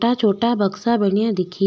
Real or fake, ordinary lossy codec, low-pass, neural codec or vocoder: real; none; none; none